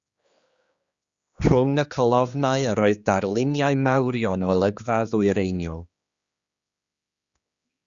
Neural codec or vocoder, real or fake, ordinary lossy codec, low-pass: codec, 16 kHz, 2 kbps, X-Codec, HuBERT features, trained on general audio; fake; Opus, 64 kbps; 7.2 kHz